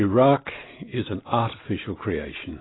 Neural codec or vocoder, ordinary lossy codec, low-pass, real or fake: none; AAC, 16 kbps; 7.2 kHz; real